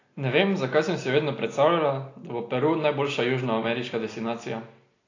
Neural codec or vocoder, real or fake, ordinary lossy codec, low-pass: none; real; AAC, 32 kbps; 7.2 kHz